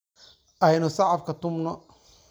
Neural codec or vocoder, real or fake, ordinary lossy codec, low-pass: none; real; none; none